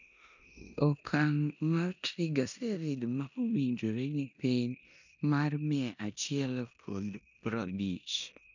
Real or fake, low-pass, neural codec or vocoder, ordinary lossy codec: fake; 7.2 kHz; codec, 16 kHz in and 24 kHz out, 0.9 kbps, LongCat-Audio-Codec, four codebook decoder; none